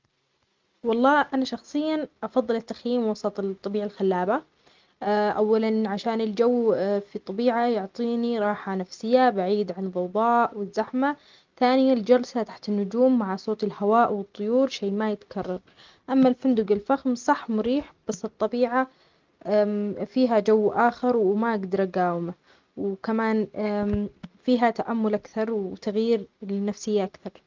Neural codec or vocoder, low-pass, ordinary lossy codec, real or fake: none; 7.2 kHz; Opus, 32 kbps; real